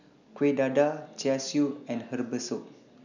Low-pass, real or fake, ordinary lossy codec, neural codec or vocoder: 7.2 kHz; real; none; none